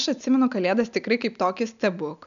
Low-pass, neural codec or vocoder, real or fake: 7.2 kHz; none; real